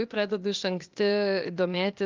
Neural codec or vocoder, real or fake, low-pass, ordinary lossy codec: codec, 16 kHz, 0.8 kbps, ZipCodec; fake; 7.2 kHz; Opus, 32 kbps